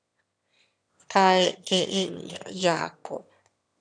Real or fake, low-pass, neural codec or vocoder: fake; 9.9 kHz; autoencoder, 22.05 kHz, a latent of 192 numbers a frame, VITS, trained on one speaker